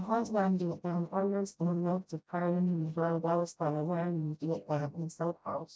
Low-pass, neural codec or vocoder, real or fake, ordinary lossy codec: none; codec, 16 kHz, 0.5 kbps, FreqCodec, smaller model; fake; none